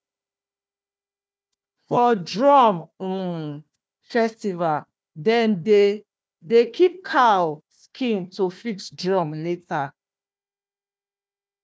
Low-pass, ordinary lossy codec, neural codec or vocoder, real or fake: none; none; codec, 16 kHz, 1 kbps, FunCodec, trained on Chinese and English, 50 frames a second; fake